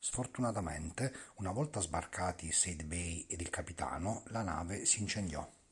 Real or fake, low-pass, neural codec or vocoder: real; 10.8 kHz; none